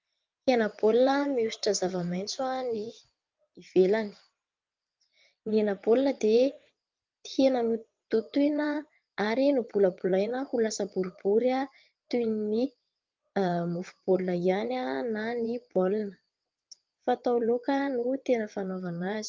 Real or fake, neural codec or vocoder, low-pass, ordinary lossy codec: fake; vocoder, 22.05 kHz, 80 mel bands, WaveNeXt; 7.2 kHz; Opus, 24 kbps